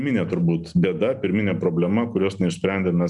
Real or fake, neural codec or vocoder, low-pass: real; none; 10.8 kHz